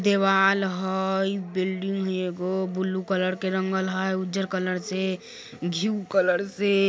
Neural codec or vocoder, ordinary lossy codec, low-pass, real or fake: none; none; none; real